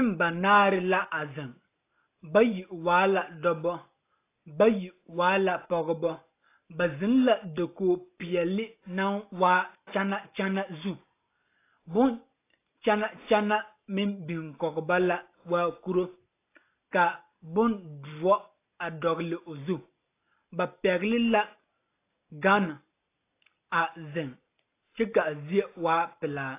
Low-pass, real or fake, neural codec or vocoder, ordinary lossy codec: 3.6 kHz; real; none; AAC, 24 kbps